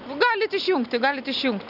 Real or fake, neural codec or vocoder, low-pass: real; none; 5.4 kHz